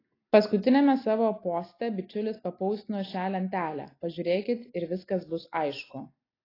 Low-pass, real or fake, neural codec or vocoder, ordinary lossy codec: 5.4 kHz; real; none; AAC, 24 kbps